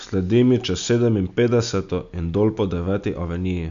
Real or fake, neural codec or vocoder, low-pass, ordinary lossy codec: real; none; 7.2 kHz; none